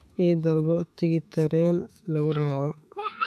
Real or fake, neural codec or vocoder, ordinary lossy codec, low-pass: fake; autoencoder, 48 kHz, 32 numbers a frame, DAC-VAE, trained on Japanese speech; none; 14.4 kHz